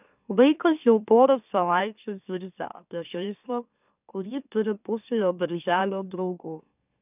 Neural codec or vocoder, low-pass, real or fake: autoencoder, 44.1 kHz, a latent of 192 numbers a frame, MeloTTS; 3.6 kHz; fake